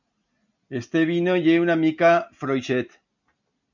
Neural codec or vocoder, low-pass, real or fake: none; 7.2 kHz; real